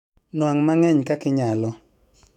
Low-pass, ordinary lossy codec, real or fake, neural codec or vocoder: 19.8 kHz; none; fake; autoencoder, 48 kHz, 128 numbers a frame, DAC-VAE, trained on Japanese speech